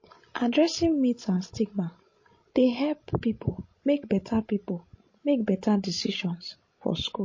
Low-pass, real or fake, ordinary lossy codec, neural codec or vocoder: 7.2 kHz; fake; MP3, 32 kbps; codec, 16 kHz, 16 kbps, FreqCodec, larger model